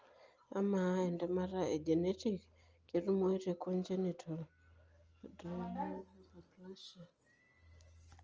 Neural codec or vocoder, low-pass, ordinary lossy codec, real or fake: none; 7.2 kHz; Opus, 24 kbps; real